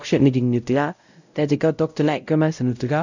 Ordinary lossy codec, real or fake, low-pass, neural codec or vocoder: none; fake; 7.2 kHz; codec, 16 kHz, 0.5 kbps, X-Codec, WavLM features, trained on Multilingual LibriSpeech